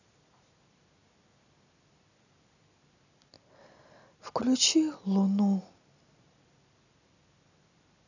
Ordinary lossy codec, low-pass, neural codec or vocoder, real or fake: none; 7.2 kHz; none; real